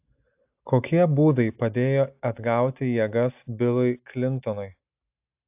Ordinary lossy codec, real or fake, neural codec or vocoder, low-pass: AAC, 32 kbps; real; none; 3.6 kHz